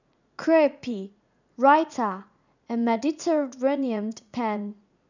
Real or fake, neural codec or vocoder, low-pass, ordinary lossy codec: fake; vocoder, 44.1 kHz, 80 mel bands, Vocos; 7.2 kHz; none